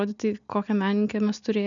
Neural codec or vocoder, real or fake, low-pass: none; real; 7.2 kHz